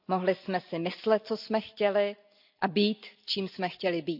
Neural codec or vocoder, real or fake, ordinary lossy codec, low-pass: none; real; AAC, 48 kbps; 5.4 kHz